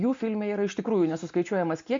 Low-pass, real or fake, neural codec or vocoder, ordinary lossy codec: 7.2 kHz; real; none; MP3, 48 kbps